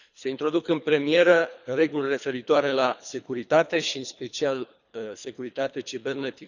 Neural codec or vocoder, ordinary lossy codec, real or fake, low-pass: codec, 24 kHz, 3 kbps, HILCodec; none; fake; 7.2 kHz